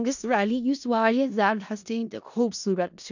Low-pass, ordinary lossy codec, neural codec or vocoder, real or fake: 7.2 kHz; none; codec, 16 kHz in and 24 kHz out, 0.4 kbps, LongCat-Audio-Codec, four codebook decoder; fake